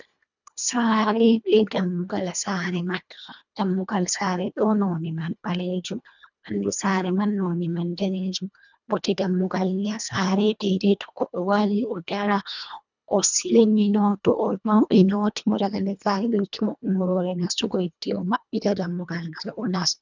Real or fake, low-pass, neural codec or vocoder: fake; 7.2 kHz; codec, 24 kHz, 1.5 kbps, HILCodec